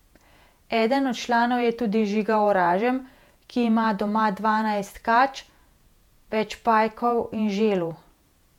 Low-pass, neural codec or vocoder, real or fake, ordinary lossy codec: 19.8 kHz; vocoder, 48 kHz, 128 mel bands, Vocos; fake; MP3, 96 kbps